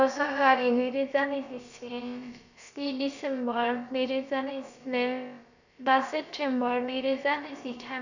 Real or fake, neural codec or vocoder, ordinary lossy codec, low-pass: fake; codec, 16 kHz, about 1 kbps, DyCAST, with the encoder's durations; none; 7.2 kHz